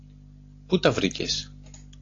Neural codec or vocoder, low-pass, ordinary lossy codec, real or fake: none; 7.2 kHz; AAC, 32 kbps; real